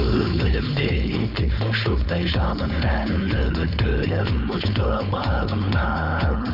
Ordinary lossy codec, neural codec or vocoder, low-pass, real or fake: none; codec, 16 kHz, 4.8 kbps, FACodec; 5.4 kHz; fake